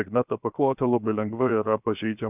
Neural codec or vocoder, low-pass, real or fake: codec, 16 kHz, 0.7 kbps, FocalCodec; 3.6 kHz; fake